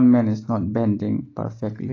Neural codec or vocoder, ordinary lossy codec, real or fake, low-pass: none; AAC, 32 kbps; real; 7.2 kHz